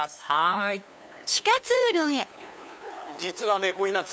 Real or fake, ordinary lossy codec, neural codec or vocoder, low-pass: fake; none; codec, 16 kHz, 2 kbps, FunCodec, trained on LibriTTS, 25 frames a second; none